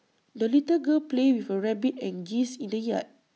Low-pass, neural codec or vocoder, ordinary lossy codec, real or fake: none; none; none; real